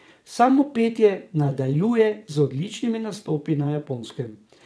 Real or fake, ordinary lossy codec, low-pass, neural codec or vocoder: fake; none; none; vocoder, 22.05 kHz, 80 mel bands, WaveNeXt